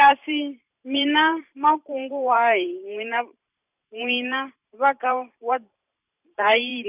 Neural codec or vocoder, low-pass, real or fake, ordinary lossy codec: vocoder, 44.1 kHz, 128 mel bands every 512 samples, BigVGAN v2; 3.6 kHz; fake; none